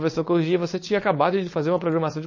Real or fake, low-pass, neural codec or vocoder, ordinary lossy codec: fake; 7.2 kHz; codec, 16 kHz, 4.8 kbps, FACodec; MP3, 32 kbps